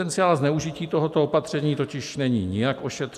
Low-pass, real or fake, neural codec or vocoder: 14.4 kHz; real; none